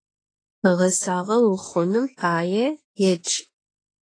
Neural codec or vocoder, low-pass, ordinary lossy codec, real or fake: autoencoder, 48 kHz, 32 numbers a frame, DAC-VAE, trained on Japanese speech; 9.9 kHz; AAC, 32 kbps; fake